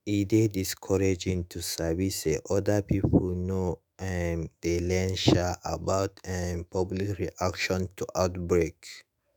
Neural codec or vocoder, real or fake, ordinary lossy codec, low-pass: autoencoder, 48 kHz, 128 numbers a frame, DAC-VAE, trained on Japanese speech; fake; none; none